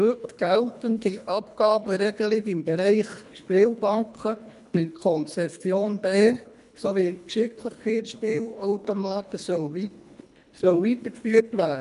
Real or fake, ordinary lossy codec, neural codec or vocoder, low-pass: fake; none; codec, 24 kHz, 1.5 kbps, HILCodec; 10.8 kHz